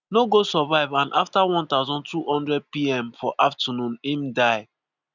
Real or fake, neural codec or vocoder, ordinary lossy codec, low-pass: real; none; none; 7.2 kHz